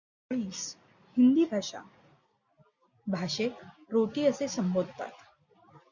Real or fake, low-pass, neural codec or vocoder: real; 7.2 kHz; none